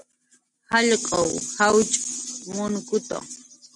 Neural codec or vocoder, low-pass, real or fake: none; 10.8 kHz; real